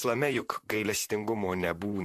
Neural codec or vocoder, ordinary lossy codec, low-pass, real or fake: vocoder, 44.1 kHz, 128 mel bands, Pupu-Vocoder; AAC, 64 kbps; 14.4 kHz; fake